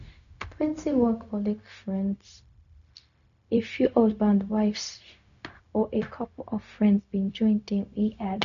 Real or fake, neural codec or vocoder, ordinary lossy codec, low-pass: fake; codec, 16 kHz, 0.4 kbps, LongCat-Audio-Codec; none; 7.2 kHz